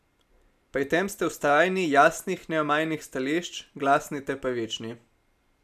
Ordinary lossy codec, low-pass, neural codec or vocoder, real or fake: none; 14.4 kHz; none; real